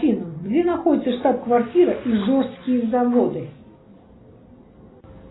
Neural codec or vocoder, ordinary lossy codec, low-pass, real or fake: none; AAC, 16 kbps; 7.2 kHz; real